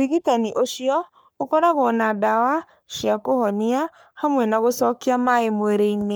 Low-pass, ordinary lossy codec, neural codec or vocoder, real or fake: none; none; codec, 44.1 kHz, 3.4 kbps, Pupu-Codec; fake